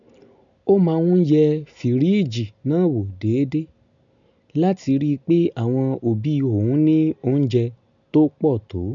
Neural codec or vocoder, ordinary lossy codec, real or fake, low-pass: none; none; real; 7.2 kHz